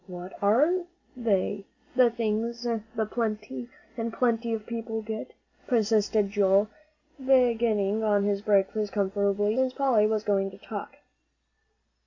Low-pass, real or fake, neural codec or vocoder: 7.2 kHz; real; none